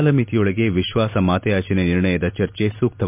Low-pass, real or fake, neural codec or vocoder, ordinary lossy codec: 3.6 kHz; real; none; MP3, 32 kbps